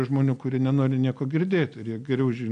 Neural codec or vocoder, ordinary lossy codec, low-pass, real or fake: none; AAC, 96 kbps; 9.9 kHz; real